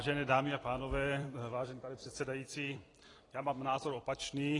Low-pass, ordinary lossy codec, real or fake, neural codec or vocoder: 10.8 kHz; AAC, 32 kbps; fake; vocoder, 44.1 kHz, 128 mel bands every 256 samples, BigVGAN v2